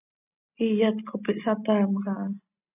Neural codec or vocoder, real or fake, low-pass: none; real; 3.6 kHz